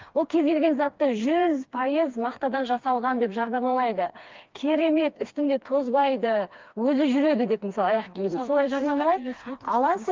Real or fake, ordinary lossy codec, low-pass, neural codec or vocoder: fake; Opus, 24 kbps; 7.2 kHz; codec, 16 kHz, 2 kbps, FreqCodec, smaller model